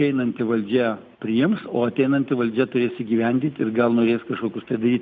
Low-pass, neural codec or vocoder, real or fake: 7.2 kHz; none; real